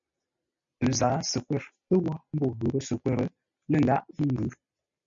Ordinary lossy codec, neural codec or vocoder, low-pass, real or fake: MP3, 64 kbps; none; 7.2 kHz; real